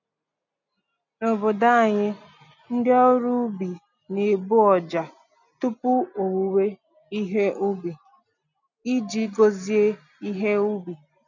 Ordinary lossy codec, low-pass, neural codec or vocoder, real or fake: none; 7.2 kHz; none; real